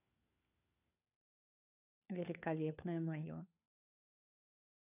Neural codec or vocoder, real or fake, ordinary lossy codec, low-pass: codec, 16 kHz, 4 kbps, FunCodec, trained on LibriTTS, 50 frames a second; fake; none; 3.6 kHz